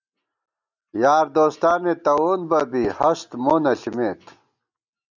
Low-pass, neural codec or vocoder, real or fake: 7.2 kHz; none; real